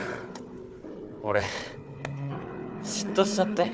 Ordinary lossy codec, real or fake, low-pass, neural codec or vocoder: none; fake; none; codec, 16 kHz, 4 kbps, FunCodec, trained on Chinese and English, 50 frames a second